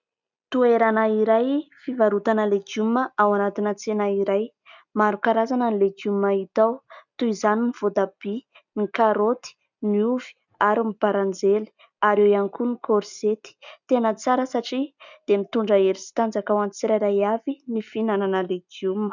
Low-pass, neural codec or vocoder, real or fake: 7.2 kHz; none; real